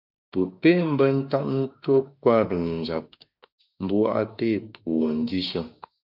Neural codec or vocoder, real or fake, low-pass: autoencoder, 48 kHz, 32 numbers a frame, DAC-VAE, trained on Japanese speech; fake; 5.4 kHz